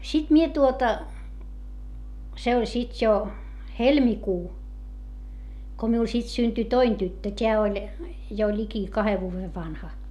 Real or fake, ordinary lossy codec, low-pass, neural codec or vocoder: real; none; 14.4 kHz; none